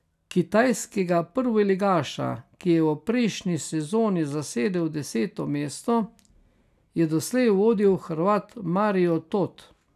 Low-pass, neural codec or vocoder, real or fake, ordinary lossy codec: 14.4 kHz; none; real; none